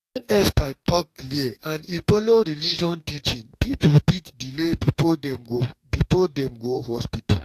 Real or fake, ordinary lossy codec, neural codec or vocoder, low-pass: fake; AAC, 64 kbps; codec, 44.1 kHz, 2.6 kbps, DAC; 14.4 kHz